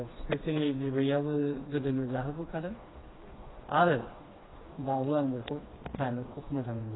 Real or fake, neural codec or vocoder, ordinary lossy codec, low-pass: fake; codec, 16 kHz, 2 kbps, FreqCodec, smaller model; AAC, 16 kbps; 7.2 kHz